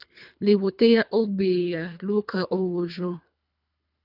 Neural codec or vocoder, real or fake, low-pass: codec, 24 kHz, 3 kbps, HILCodec; fake; 5.4 kHz